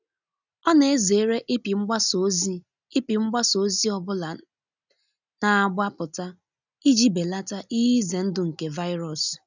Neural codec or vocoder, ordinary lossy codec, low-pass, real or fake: none; none; 7.2 kHz; real